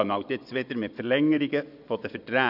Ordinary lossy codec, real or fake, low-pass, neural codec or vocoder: none; fake; 5.4 kHz; vocoder, 24 kHz, 100 mel bands, Vocos